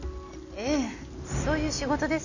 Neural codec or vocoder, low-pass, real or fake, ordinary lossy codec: none; 7.2 kHz; real; none